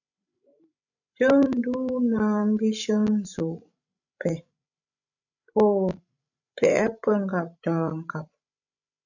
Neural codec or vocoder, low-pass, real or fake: codec, 16 kHz, 16 kbps, FreqCodec, larger model; 7.2 kHz; fake